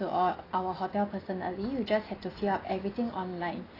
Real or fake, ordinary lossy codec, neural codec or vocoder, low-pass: real; AAC, 24 kbps; none; 5.4 kHz